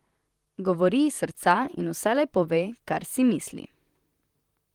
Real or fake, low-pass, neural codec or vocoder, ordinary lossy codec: fake; 19.8 kHz; vocoder, 44.1 kHz, 128 mel bands, Pupu-Vocoder; Opus, 24 kbps